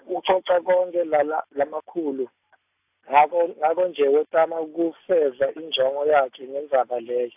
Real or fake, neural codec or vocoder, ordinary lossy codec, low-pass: real; none; AAC, 32 kbps; 3.6 kHz